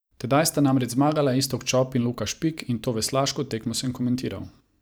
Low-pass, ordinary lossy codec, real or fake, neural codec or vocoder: none; none; fake; vocoder, 44.1 kHz, 128 mel bands every 512 samples, BigVGAN v2